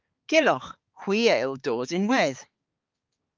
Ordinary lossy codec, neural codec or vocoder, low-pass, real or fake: Opus, 32 kbps; codec, 16 kHz, 4 kbps, X-Codec, HuBERT features, trained on balanced general audio; 7.2 kHz; fake